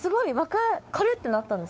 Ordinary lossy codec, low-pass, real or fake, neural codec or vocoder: none; none; fake; codec, 16 kHz, 4 kbps, X-Codec, WavLM features, trained on Multilingual LibriSpeech